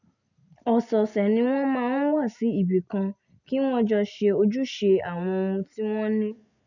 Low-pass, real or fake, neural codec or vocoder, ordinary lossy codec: 7.2 kHz; real; none; none